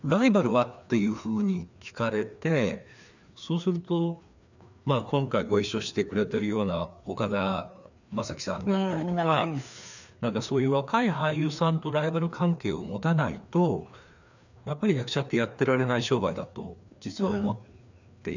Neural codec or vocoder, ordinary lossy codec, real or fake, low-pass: codec, 16 kHz, 2 kbps, FreqCodec, larger model; none; fake; 7.2 kHz